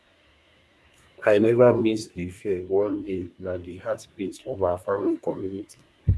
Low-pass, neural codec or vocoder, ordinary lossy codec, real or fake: none; codec, 24 kHz, 1 kbps, SNAC; none; fake